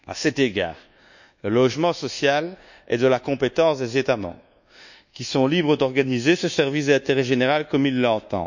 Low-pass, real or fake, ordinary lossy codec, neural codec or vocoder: 7.2 kHz; fake; none; codec, 24 kHz, 1.2 kbps, DualCodec